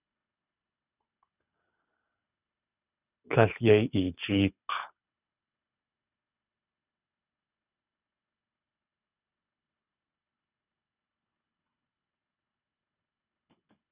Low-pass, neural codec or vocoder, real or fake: 3.6 kHz; codec, 24 kHz, 6 kbps, HILCodec; fake